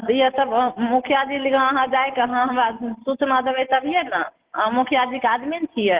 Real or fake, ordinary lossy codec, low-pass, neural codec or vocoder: real; Opus, 16 kbps; 3.6 kHz; none